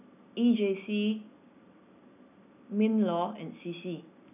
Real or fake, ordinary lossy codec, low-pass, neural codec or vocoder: real; none; 3.6 kHz; none